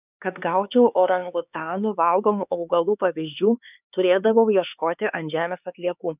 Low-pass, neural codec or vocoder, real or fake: 3.6 kHz; codec, 16 kHz, 4 kbps, X-Codec, HuBERT features, trained on LibriSpeech; fake